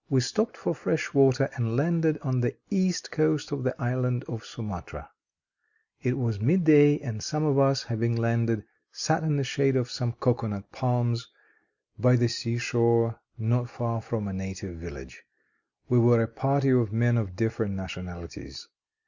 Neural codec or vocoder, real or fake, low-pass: none; real; 7.2 kHz